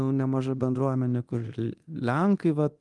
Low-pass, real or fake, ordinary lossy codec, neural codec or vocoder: 10.8 kHz; fake; Opus, 24 kbps; codec, 24 kHz, 0.9 kbps, DualCodec